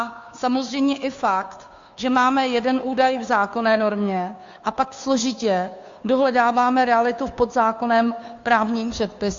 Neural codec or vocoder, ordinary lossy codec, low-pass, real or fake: codec, 16 kHz, 2 kbps, FunCodec, trained on Chinese and English, 25 frames a second; AAC, 64 kbps; 7.2 kHz; fake